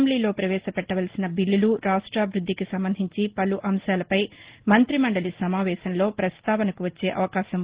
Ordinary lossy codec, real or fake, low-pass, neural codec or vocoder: Opus, 16 kbps; real; 3.6 kHz; none